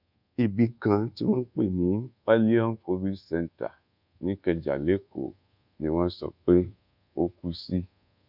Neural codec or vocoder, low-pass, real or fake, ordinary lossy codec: codec, 24 kHz, 1.2 kbps, DualCodec; 5.4 kHz; fake; none